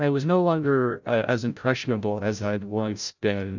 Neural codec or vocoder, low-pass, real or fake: codec, 16 kHz, 0.5 kbps, FreqCodec, larger model; 7.2 kHz; fake